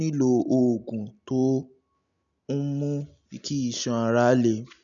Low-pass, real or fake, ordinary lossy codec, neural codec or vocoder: 7.2 kHz; real; none; none